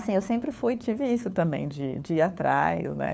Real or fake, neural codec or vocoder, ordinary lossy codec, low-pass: fake; codec, 16 kHz, 4 kbps, FunCodec, trained on LibriTTS, 50 frames a second; none; none